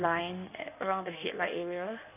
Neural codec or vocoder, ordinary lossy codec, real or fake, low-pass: codec, 16 kHz in and 24 kHz out, 1.1 kbps, FireRedTTS-2 codec; none; fake; 3.6 kHz